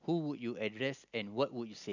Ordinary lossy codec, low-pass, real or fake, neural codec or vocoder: none; 7.2 kHz; real; none